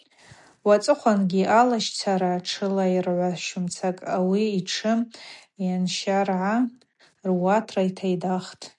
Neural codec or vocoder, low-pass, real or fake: none; 10.8 kHz; real